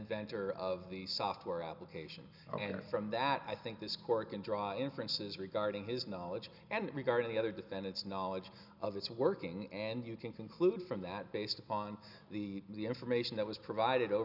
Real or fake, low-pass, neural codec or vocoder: real; 5.4 kHz; none